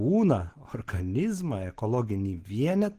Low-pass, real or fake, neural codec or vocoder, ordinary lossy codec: 14.4 kHz; real; none; Opus, 16 kbps